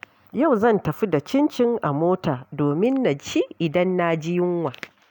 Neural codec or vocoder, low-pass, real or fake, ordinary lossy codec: none; 19.8 kHz; real; none